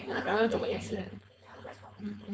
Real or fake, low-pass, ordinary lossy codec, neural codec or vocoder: fake; none; none; codec, 16 kHz, 4.8 kbps, FACodec